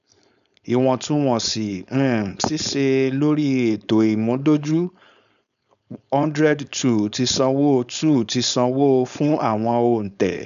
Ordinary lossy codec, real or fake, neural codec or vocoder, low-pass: none; fake; codec, 16 kHz, 4.8 kbps, FACodec; 7.2 kHz